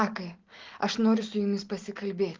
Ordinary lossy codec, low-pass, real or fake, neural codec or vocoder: Opus, 32 kbps; 7.2 kHz; real; none